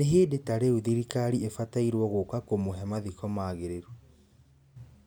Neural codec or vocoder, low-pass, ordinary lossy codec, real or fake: none; none; none; real